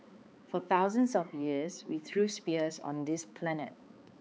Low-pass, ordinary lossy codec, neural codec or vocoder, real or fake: none; none; codec, 16 kHz, 4 kbps, X-Codec, HuBERT features, trained on balanced general audio; fake